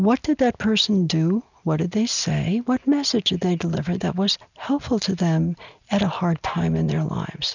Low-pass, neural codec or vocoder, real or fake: 7.2 kHz; none; real